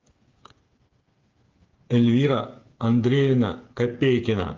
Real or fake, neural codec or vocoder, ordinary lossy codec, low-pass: fake; codec, 16 kHz, 8 kbps, FreqCodec, smaller model; Opus, 32 kbps; 7.2 kHz